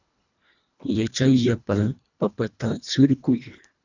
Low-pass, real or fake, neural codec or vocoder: 7.2 kHz; fake; codec, 24 kHz, 1.5 kbps, HILCodec